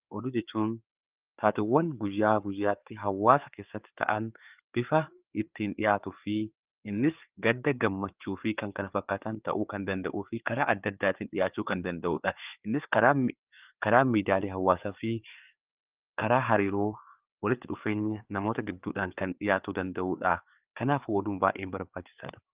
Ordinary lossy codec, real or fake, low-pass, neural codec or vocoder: Opus, 24 kbps; fake; 3.6 kHz; codec, 16 kHz, 4 kbps, FreqCodec, larger model